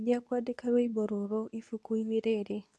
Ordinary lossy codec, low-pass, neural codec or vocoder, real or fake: none; none; codec, 24 kHz, 0.9 kbps, WavTokenizer, medium speech release version 2; fake